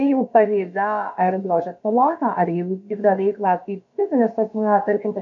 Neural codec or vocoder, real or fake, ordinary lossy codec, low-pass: codec, 16 kHz, about 1 kbps, DyCAST, with the encoder's durations; fake; MP3, 64 kbps; 7.2 kHz